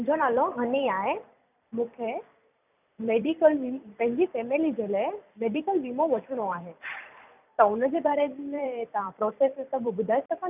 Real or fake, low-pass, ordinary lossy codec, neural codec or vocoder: fake; 3.6 kHz; none; vocoder, 44.1 kHz, 128 mel bands every 256 samples, BigVGAN v2